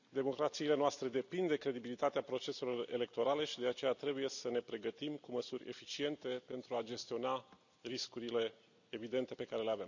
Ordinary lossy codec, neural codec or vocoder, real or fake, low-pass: none; none; real; 7.2 kHz